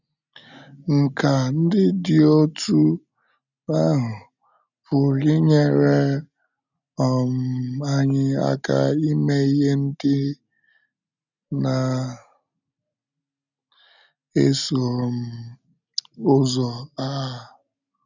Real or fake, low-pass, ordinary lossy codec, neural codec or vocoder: real; 7.2 kHz; none; none